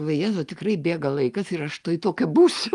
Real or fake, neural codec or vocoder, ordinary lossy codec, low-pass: real; none; Opus, 32 kbps; 10.8 kHz